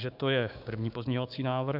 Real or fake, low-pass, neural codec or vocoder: fake; 5.4 kHz; codec, 16 kHz, 6 kbps, DAC